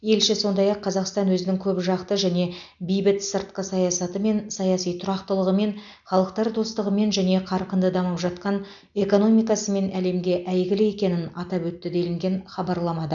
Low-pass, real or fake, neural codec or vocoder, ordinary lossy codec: 7.2 kHz; real; none; none